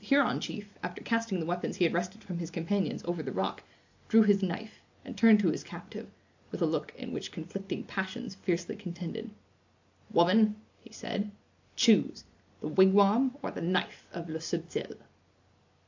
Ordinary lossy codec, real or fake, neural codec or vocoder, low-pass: AAC, 48 kbps; real; none; 7.2 kHz